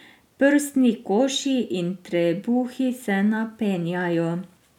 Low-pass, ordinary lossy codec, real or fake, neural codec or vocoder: 19.8 kHz; none; real; none